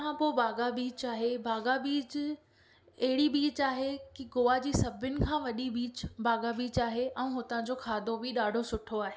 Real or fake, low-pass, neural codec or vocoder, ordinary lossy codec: real; none; none; none